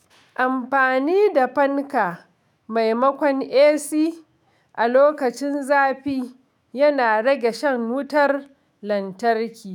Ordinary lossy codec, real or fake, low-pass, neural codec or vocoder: none; fake; 19.8 kHz; autoencoder, 48 kHz, 128 numbers a frame, DAC-VAE, trained on Japanese speech